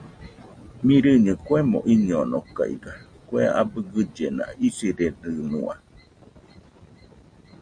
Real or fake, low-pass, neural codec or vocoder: real; 9.9 kHz; none